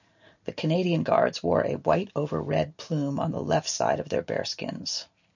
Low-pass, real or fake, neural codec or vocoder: 7.2 kHz; real; none